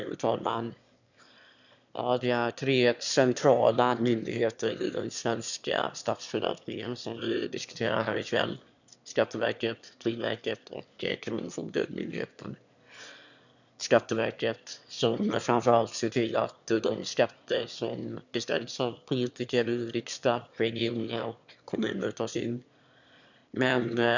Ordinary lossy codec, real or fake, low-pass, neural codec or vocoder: none; fake; 7.2 kHz; autoencoder, 22.05 kHz, a latent of 192 numbers a frame, VITS, trained on one speaker